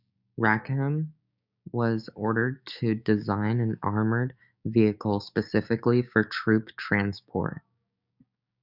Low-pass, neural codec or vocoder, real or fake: 5.4 kHz; codec, 16 kHz, 6 kbps, DAC; fake